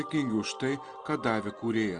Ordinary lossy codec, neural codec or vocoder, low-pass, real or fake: Opus, 24 kbps; none; 9.9 kHz; real